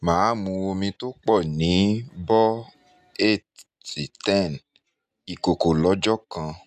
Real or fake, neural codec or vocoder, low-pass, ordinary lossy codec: real; none; 14.4 kHz; none